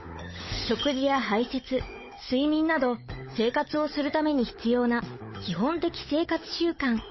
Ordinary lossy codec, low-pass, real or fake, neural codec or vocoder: MP3, 24 kbps; 7.2 kHz; fake; codec, 16 kHz, 16 kbps, FunCodec, trained on LibriTTS, 50 frames a second